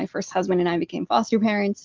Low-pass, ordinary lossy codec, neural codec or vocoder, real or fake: 7.2 kHz; Opus, 32 kbps; none; real